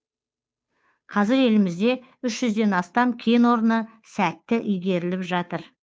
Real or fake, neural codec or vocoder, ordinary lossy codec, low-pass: fake; codec, 16 kHz, 2 kbps, FunCodec, trained on Chinese and English, 25 frames a second; none; none